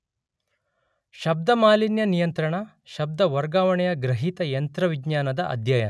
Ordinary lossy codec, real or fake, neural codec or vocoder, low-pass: none; real; none; none